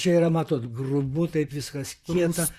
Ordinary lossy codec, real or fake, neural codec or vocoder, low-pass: Opus, 64 kbps; fake; vocoder, 44.1 kHz, 128 mel bands, Pupu-Vocoder; 14.4 kHz